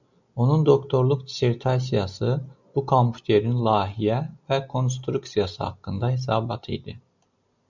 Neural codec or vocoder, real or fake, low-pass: none; real; 7.2 kHz